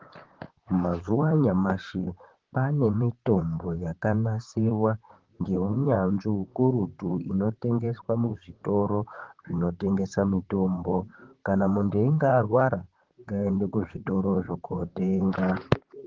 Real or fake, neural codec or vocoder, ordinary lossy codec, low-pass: fake; vocoder, 44.1 kHz, 128 mel bands, Pupu-Vocoder; Opus, 16 kbps; 7.2 kHz